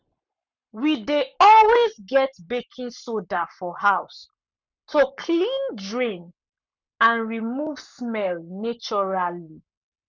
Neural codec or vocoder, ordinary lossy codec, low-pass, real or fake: codec, 44.1 kHz, 7.8 kbps, Pupu-Codec; none; 7.2 kHz; fake